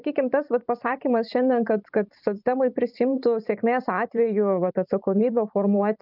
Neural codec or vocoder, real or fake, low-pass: vocoder, 44.1 kHz, 128 mel bands every 512 samples, BigVGAN v2; fake; 5.4 kHz